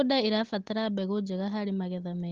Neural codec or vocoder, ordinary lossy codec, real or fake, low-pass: none; Opus, 16 kbps; real; 7.2 kHz